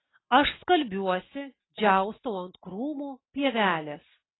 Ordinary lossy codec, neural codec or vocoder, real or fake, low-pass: AAC, 16 kbps; none; real; 7.2 kHz